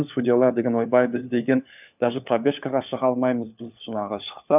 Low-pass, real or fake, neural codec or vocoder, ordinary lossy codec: 3.6 kHz; fake; codec, 16 kHz, 4 kbps, FunCodec, trained on LibriTTS, 50 frames a second; none